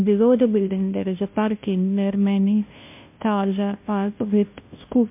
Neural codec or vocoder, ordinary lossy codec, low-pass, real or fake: codec, 16 kHz, 0.5 kbps, FunCodec, trained on Chinese and English, 25 frames a second; MP3, 32 kbps; 3.6 kHz; fake